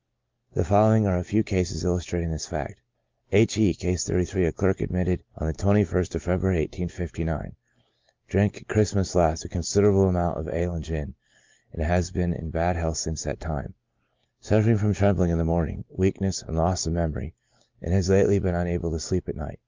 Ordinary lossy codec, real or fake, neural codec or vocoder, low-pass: Opus, 24 kbps; real; none; 7.2 kHz